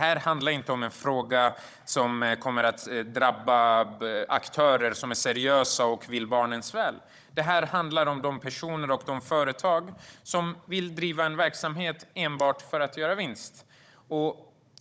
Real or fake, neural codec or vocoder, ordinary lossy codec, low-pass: fake; codec, 16 kHz, 16 kbps, FunCodec, trained on Chinese and English, 50 frames a second; none; none